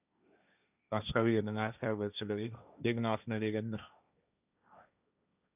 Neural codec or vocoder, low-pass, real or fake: codec, 16 kHz, 1.1 kbps, Voila-Tokenizer; 3.6 kHz; fake